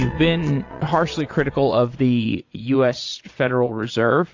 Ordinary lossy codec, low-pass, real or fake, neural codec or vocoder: AAC, 48 kbps; 7.2 kHz; fake; vocoder, 22.05 kHz, 80 mel bands, Vocos